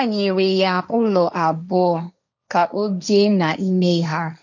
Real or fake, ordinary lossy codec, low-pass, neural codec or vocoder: fake; none; 7.2 kHz; codec, 16 kHz, 1.1 kbps, Voila-Tokenizer